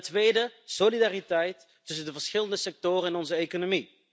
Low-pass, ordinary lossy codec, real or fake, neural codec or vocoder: none; none; real; none